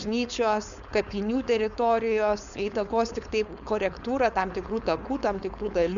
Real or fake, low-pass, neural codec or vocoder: fake; 7.2 kHz; codec, 16 kHz, 4.8 kbps, FACodec